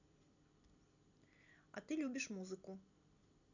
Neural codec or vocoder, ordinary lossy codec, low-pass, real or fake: none; none; 7.2 kHz; real